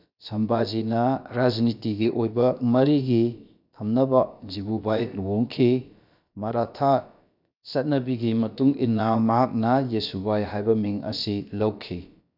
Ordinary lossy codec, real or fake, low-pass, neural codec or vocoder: none; fake; 5.4 kHz; codec, 16 kHz, about 1 kbps, DyCAST, with the encoder's durations